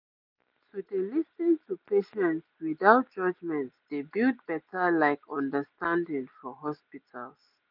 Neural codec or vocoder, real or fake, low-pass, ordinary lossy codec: none; real; 5.4 kHz; none